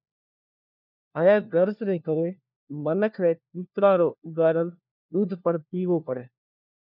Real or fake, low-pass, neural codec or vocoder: fake; 5.4 kHz; codec, 16 kHz, 1 kbps, FunCodec, trained on LibriTTS, 50 frames a second